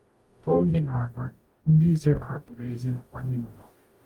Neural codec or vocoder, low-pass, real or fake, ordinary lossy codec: codec, 44.1 kHz, 0.9 kbps, DAC; 19.8 kHz; fake; Opus, 32 kbps